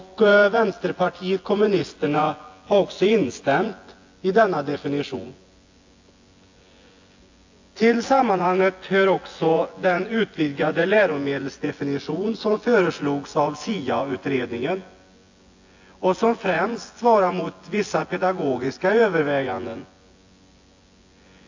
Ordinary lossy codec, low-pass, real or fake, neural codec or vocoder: AAC, 48 kbps; 7.2 kHz; fake; vocoder, 24 kHz, 100 mel bands, Vocos